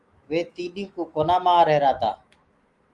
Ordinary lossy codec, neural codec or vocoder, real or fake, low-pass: Opus, 32 kbps; autoencoder, 48 kHz, 128 numbers a frame, DAC-VAE, trained on Japanese speech; fake; 10.8 kHz